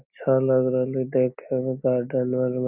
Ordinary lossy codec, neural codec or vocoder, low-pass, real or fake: none; none; 3.6 kHz; real